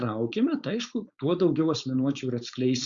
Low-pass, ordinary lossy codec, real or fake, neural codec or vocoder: 7.2 kHz; Opus, 64 kbps; fake; codec, 16 kHz, 4.8 kbps, FACodec